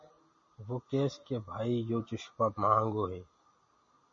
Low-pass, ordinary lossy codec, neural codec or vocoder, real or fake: 7.2 kHz; MP3, 32 kbps; none; real